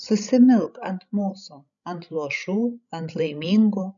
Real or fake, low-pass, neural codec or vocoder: fake; 7.2 kHz; codec, 16 kHz, 16 kbps, FreqCodec, larger model